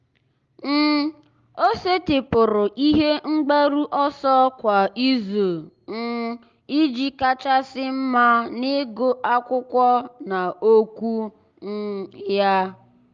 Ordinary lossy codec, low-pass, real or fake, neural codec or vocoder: Opus, 32 kbps; 7.2 kHz; real; none